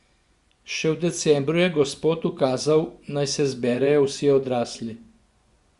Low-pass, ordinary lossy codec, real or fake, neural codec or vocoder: 10.8 kHz; Opus, 64 kbps; fake; vocoder, 24 kHz, 100 mel bands, Vocos